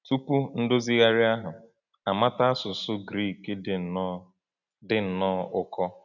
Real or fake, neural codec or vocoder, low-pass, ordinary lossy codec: real; none; 7.2 kHz; none